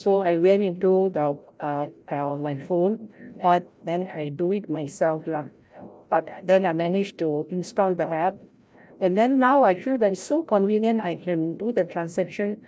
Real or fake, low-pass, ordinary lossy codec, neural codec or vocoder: fake; none; none; codec, 16 kHz, 0.5 kbps, FreqCodec, larger model